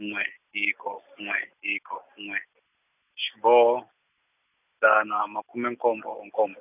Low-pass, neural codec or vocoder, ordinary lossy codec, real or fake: 3.6 kHz; none; none; real